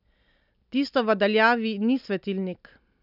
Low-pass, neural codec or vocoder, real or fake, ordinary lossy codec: 5.4 kHz; none; real; none